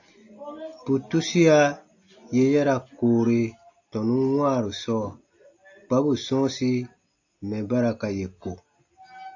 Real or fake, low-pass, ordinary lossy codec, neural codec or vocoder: real; 7.2 kHz; AAC, 48 kbps; none